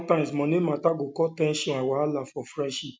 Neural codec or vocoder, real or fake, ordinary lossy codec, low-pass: none; real; none; none